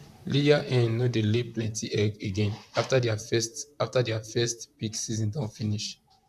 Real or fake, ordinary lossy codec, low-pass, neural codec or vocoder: fake; none; 14.4 kHz; vocoder, 44.1 kHz, 128 mel bands, Pupu-Vocoder